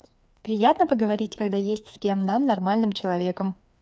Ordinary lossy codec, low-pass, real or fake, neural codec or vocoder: none; none; fake; codec, 16 kHz, 2 kbps, FreqCodec, larger model